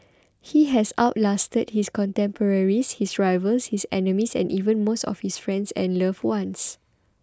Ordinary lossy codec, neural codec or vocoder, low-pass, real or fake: none; none; none; real